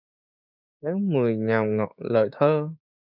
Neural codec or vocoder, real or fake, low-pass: codec, 16 kHz, 6 kbps, DAC; fake; 5.4 kHz